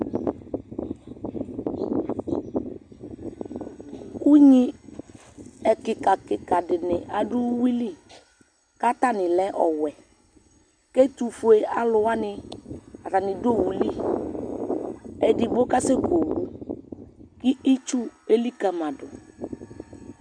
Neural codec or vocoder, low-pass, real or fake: none; 9.9 kHz; real